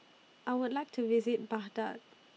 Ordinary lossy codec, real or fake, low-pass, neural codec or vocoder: none; real; none; none